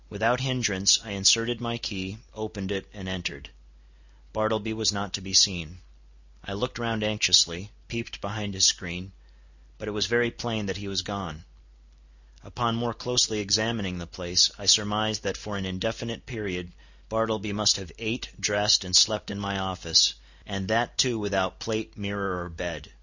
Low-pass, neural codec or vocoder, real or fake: 7.2 kHz; none; real